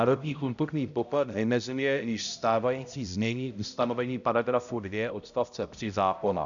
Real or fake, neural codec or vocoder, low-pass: fake; codec, 16 kHz, 0.5 kbps, X-Codec, HuBERT features, trained on balanced general audio; 7.2 kHz